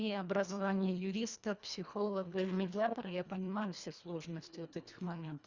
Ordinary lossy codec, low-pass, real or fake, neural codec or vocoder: Opus, 64 kbps; 7.2 kHz; fake; codec, 24 kHz, 1.5 kbps, HILCodec